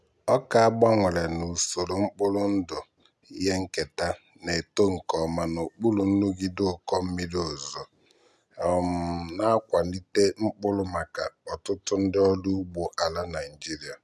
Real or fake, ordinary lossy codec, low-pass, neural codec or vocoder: real; none; none; none